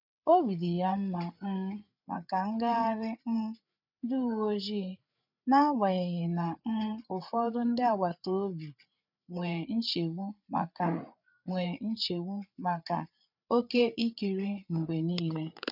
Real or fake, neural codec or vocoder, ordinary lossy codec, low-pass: fake; codec, 16 kHz, 8 kbps, FreqCodec, larger model; none; 5.4 kHz